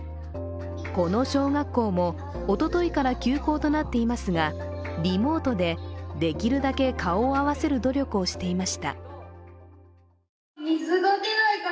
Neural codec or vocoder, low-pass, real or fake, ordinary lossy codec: none; none; real; none